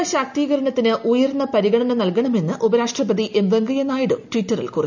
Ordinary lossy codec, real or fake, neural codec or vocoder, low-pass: none; real; none; 7.2 kHz